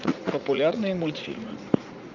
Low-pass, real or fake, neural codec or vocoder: 7.2 kHz; fake; vocoder, 44.1 kHz, 80 mel bands, Vocos